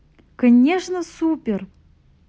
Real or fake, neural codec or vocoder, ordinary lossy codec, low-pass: real; none; none; none